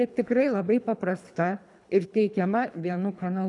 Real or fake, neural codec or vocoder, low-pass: fake; codec, 24 kHz, 3 kbps, HILCodec; 10.8 kHz